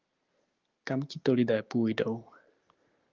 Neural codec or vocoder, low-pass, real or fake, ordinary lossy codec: none; 7.2 kHz; real; Opus, 32 kbps